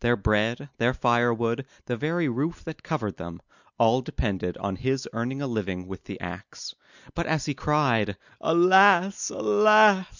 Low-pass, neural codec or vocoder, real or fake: 7.2 kHz; none; real